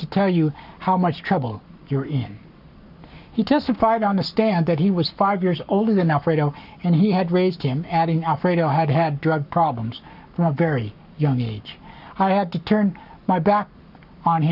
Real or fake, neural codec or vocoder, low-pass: fake; codec, 44.1 kHz, 7.8 kbps, Pupu-Codec; 5.4 kHz